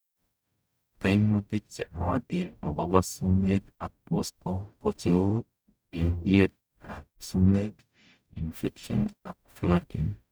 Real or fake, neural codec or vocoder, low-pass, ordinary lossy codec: fake; codec, 44.1 kHz, 0.9 kbps, DAC; none; none